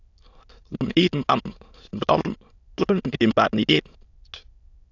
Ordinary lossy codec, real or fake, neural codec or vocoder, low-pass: AAC, 48 kbps; fake; autoencoder, 22.05 kHz, a latent of 192 numbers a frame, VITS, trained on many speakers; 7.2 kHz